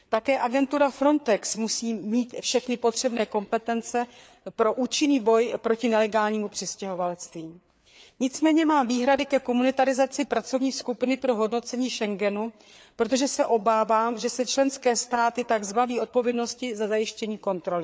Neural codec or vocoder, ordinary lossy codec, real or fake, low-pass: codec, 16 kHz, 4 kbps, FreqCodec, larger model; none; fake; none